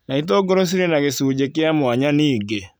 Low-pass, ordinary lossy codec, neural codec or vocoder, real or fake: none; none; none; real